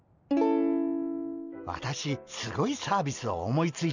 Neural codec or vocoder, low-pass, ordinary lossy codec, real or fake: none; 7.2 kHz; none; real